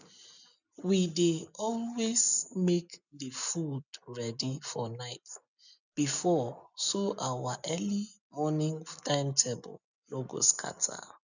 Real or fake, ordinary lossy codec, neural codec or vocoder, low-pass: real; none; none; 7.2 kHz